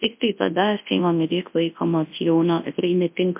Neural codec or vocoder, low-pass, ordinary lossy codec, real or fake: codec, 24 kHz, 0.9 kbps, WavTokenizer, large speech release; 3.6 kHz; MP3, 32 kbps; fake